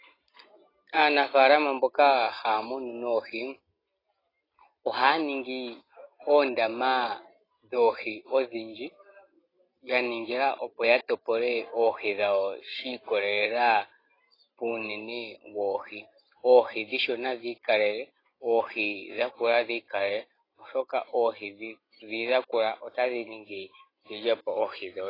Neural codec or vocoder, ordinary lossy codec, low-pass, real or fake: none; AAC, 24 kbps; 5.4 kHz; real